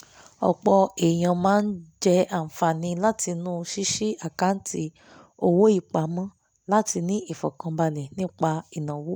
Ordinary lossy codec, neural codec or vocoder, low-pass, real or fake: none; none; none; real